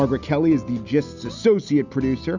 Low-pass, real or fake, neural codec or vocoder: 7.2 kHz; real; none